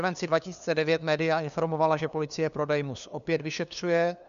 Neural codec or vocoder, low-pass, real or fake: codec, 16 kHz, 2 kbps, FunCodec, trained on LibriTTS, 25 frames a second; 7.2 kHz; fake